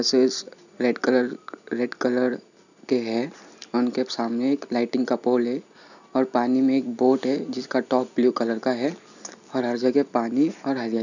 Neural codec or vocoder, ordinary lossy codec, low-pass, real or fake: none; none; 7.2 kHz; real